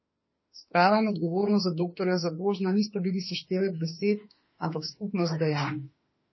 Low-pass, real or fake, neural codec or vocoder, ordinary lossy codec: 7.2 kHz; fake; autoencoder, 48 kHz, 32 numbers a frame, DAC-VAE, trained on Japanese speech; MP3, 24 kbps